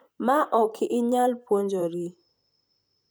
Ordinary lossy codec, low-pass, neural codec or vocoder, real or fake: none; none; vocoder, 44.1 kHz, 128 mel bands, Pupu-Vocoder; fake